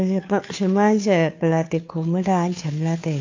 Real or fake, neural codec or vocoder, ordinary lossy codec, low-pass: fake; codec, 16 kHz, 2 kbps, FunCodec, trained on Chinese and English, 25 frames a second; AAC, 48 kbps; 7.2 kHz